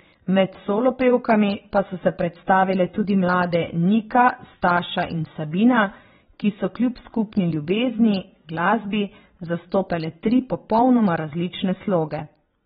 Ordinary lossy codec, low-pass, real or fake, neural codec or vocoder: AAC, 16 kbps; 9.9 kHz; fake; vocoder, 22.05 kHz, 80 mel bands, WaveNeXt